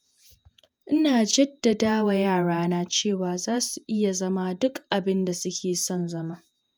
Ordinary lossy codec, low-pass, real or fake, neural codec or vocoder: none; none; fake; vocoder, 48 kHz, 128 mel bands, Vocos